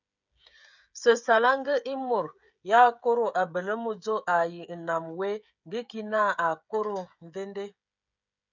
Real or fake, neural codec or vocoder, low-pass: fake; codec, 16 kHz, 16 kbps, FreqCodec, smaller model; 7.2 kHz